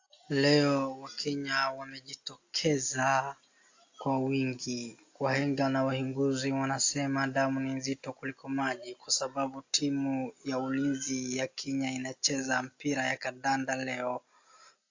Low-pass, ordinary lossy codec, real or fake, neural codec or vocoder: 7.2 kHz; AAC, 48 kbps; real; none